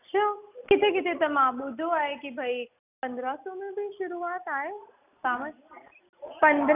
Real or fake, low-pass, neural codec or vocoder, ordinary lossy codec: real; 3.6 kHz; none; none